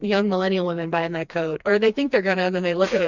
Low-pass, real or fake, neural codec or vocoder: 7.2 kHz; fake; codec, 16 kHz, 2 kbps, FreqCodec, smaller model